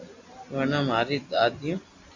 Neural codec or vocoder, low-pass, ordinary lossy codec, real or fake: none; 7.2 kHz; AAC, 48 kbps; real